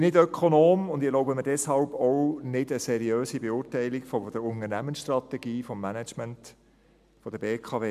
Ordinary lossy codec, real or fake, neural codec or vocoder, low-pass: MP3, 96 kbps; real; none; 14.4 kHz